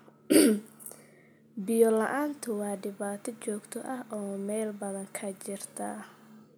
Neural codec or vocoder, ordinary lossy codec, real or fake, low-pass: none; none; real; none